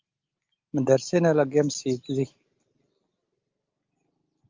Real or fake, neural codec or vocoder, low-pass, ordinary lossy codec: real; none; 7.2 kHz; Opus, 24 kbps